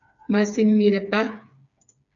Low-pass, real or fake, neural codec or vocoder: 7.2 kHz; fake; codec, 16 kHz, 4 kbps, FreqCodec, smaller model